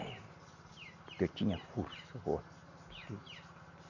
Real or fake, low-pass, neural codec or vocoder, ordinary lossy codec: real; 7.2 kHz; none; none